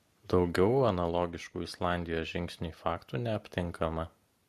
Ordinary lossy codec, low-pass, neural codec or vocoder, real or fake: MP3, 64 kbps; 14.4 kHz; none; real